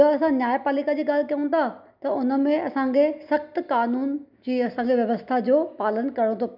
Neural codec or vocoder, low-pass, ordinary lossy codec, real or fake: none; 5.4 kHz; none; real